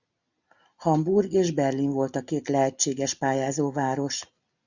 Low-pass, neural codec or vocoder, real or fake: 7.2 kHz; none; real